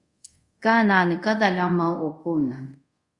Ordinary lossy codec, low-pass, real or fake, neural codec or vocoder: Opus, 64 kbps; 10.8 kHz; fake; codec, 24 kHz, 0.5 kbps, DualCodec